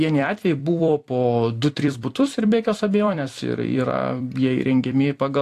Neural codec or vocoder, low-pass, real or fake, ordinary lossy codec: vocoder, 44.1 kHz, 128 mel bands every 256 samples, BigVGAN v2; 14.4 kHz; fake; AAC, 64 kbps